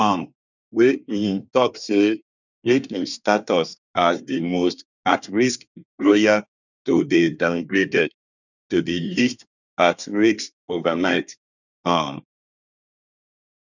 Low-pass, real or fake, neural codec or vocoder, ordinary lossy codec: 7.2 kHz; fake; codec, 24 kHz, 1 kbps, SNAC; none